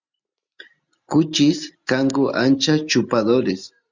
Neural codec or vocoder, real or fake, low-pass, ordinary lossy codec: none; real; 7.2 kHz; Opus, 64 kbps